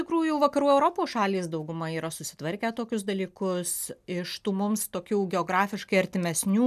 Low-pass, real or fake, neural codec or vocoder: 14.4 kHz; real; none